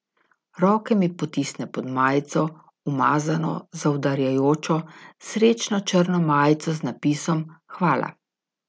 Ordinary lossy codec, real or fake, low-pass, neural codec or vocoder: none; real; none; none